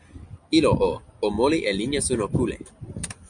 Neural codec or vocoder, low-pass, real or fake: none; 9.9 kHz; real